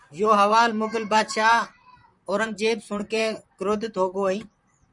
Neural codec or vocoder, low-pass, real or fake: vocoder, 44.1 kHz, 128 mel bands, Pupu-Vocoder; 10.8 kHz; fake